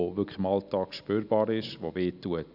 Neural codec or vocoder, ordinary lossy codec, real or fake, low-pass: none; none; real; 5.4 kHz